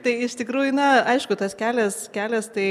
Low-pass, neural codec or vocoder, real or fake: 14.4 kHz; none; real